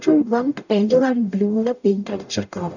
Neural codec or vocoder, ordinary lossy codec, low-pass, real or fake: codec, 44.1 kHz, 0.9 kbps, DAC; none; 7.2 kHz; fake